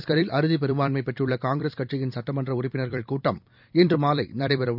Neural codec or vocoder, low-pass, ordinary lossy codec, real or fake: vocoder, 44.1 kHz, 128 mel bands every 256 samples, BigVGAN v2; 5.4 kHz; none; fake